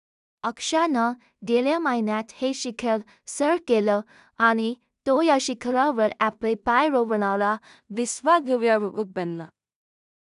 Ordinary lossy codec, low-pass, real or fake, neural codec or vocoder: none; 10.8 kHz; fake; codec, 16 kHz in and 24 kHz out, 0.4 kbps, LongCat-Audio-Codec, two codebook decoder